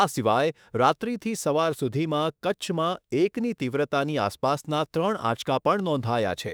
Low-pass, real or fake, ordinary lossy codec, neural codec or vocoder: none; fake; none; autoencoder, 48 kHz, 32 numbers a frame, DAC-VAE, trained on Japanese speech